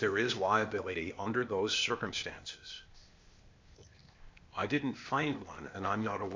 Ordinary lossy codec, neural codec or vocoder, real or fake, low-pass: MP3, 64 kbps; codec, 16 kHz, 0.8 kbps, ZipCodec; fake; 7.2 kHz